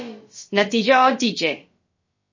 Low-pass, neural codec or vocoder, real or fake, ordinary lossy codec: 7.2 kHz; codec, 16 kHz, about 1 kbps, DyCAST, with the encoder's durations; fake; MP3, 32 kbps